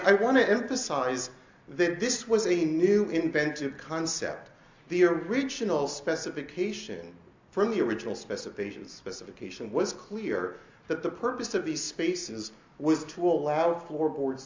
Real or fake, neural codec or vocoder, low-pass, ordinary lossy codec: real; none; 7.2 kHz; MP3, 64 kbps